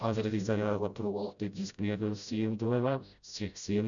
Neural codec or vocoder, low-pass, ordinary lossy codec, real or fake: codec, 16 kHz, 0.5 kbps, FreqCodec, smaller model; 7.2 kHz; none; fake